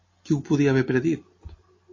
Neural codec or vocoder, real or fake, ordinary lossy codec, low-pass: none; real; MP3, 32 kbps; 7.2 kHz